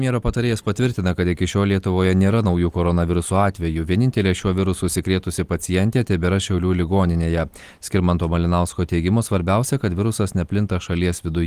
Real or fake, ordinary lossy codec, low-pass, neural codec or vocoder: real; Opus, 24 kbps; 14.4 kHz; none